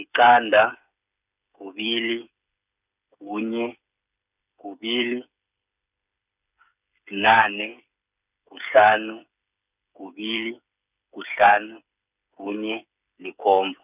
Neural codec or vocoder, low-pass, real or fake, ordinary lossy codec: codec, 16 kHz, 8 kbps, FreqCodec, smaller model; 3.6 kHz; fake; none